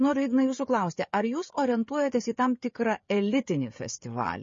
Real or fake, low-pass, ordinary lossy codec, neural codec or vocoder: fake; 7.2 kHz; MP3, 32 kbps; codec, 16 kHz, 16 kbps, FreqCodec, smaller model